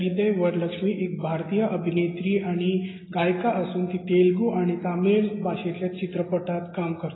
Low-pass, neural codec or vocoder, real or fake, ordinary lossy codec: 7.2 kHz; none; real; AAC, 16 kbps